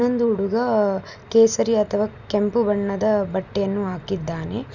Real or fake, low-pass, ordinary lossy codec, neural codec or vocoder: real; 7.2 kHz; none; none